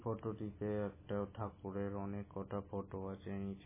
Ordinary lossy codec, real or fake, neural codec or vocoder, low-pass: MP3, 16 kbps; real; none; 3.6 kHz